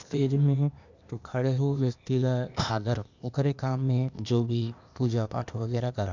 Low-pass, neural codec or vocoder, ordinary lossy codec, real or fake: 7.2 kHz; codec, 16 kHz, 0.8 kbps, ZipCodec; none; fake